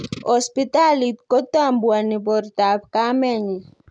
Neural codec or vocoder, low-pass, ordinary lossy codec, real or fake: none; 9.9 kHz; none; real